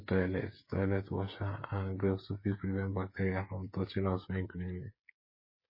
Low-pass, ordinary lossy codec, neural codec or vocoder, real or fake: 5.4 kHz; MP3, 24 kbps; codec, 16 kHz, 8 kbps, FreqCodec, smaller model; fake